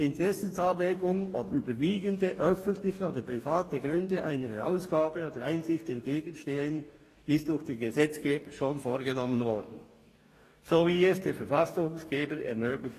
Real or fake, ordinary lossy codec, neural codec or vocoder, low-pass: fake; AAC, 48 kbps; codec, 44.1 kHz, 2.6 kbps, DAC; 14.4 kHz